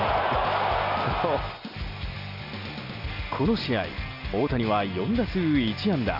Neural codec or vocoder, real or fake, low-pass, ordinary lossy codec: none; real; 5.4 kHz; none